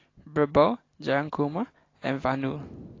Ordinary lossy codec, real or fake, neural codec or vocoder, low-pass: AAC, 32 kbps; real; none; 7.2 kHz